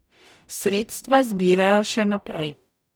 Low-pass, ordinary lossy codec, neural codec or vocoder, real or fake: none; none; codec, 44.1 kHz, 0.9 kbps, DAC; fake